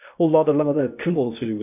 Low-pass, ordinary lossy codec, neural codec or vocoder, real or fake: 3.6 kHz; none; codec, 16 kHz, 0.8 kbps, ZipCodec; fake